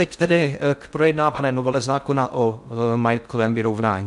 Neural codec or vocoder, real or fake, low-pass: codec, 16 kHz in and 24 kHz out, 0.6 kbps, FocalCodec, streaming, 4096 codes; fake; 10.8 kHz